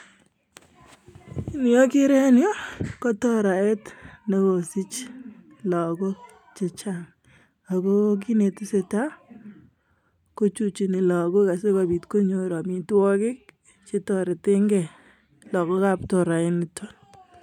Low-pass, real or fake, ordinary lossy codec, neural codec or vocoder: 19.8 kHz; real; none; none